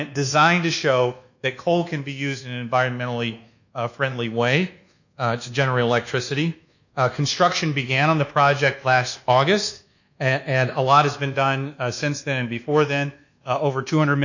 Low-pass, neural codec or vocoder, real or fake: 7.2 kHz; codec, 24 kHz, 1.2 kbps, DualCodec; fake